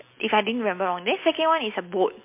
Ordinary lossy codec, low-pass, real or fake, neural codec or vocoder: MP3, 24 kbps; 3.6 kHz; real; none